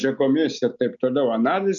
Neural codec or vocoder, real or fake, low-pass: none; real; 7.2 kHz